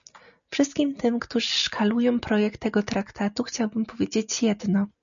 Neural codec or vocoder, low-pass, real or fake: none; 7.2 kHz; real